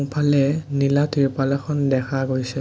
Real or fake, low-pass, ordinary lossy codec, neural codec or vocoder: real; none; none; none